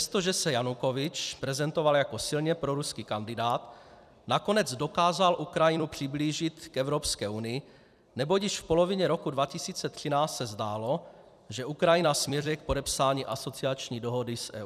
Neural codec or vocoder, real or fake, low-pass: vocoder, 44.1 kHz, 128 mel bands every 256 samples, BigVGAN v2; fake; 14.4 kHz